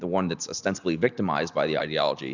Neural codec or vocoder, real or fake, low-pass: none; real; 7.2 kHz